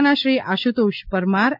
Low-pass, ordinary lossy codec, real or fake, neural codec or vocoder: 5.4 kHz; MP3, 48 kbps; real; none